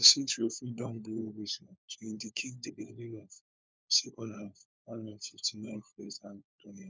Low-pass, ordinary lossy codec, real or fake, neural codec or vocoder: none; none; fake; codec, 16 kHz, 4 kbps, FunCodec, trained on LibriTTS, 50 frames a second